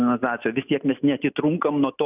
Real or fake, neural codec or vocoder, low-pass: real; none; 3.6 kHz